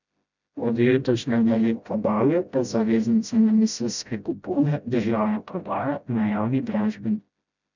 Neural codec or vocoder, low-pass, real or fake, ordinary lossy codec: codec, 16 kHz, 0.5 kbps, FreqCodec, smaller model; 7.2 kHz; fake; none